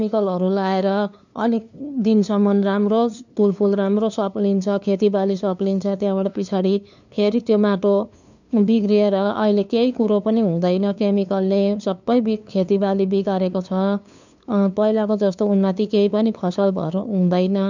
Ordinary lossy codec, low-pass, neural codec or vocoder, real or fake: none; 7.2 kHz; codec, 16 kHz, 2 kbps, FunCodec, trained on LibriTTS, 25 frames a second; fake